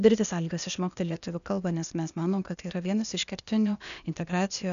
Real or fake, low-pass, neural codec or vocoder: fake; 7.2 kHz; codec, 16 kHz, 0.8 kbps, ZipCodec